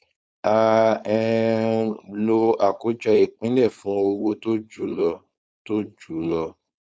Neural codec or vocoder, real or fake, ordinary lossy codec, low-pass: codec, 16 kHz, 4.8 kbps, FACodec; fake; none; none